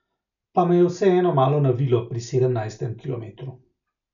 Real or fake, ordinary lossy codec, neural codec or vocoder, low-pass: real; none; none; 7.2 kHz